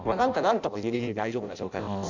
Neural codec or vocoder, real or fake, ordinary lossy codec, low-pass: codec, 16 kHz in and 24 kHz out, 0.6 kbps, FireRedTTS-2 codec; fake; none; 7.2 kHz